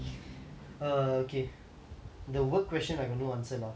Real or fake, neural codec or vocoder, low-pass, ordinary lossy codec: real; none; none; none